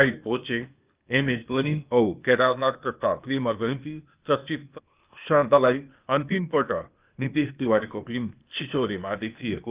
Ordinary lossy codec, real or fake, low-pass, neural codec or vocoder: Opus, 24 kbps; fake; 3.6 kHz; codec, 16 kHz, 0.8 kbps, ZipCodec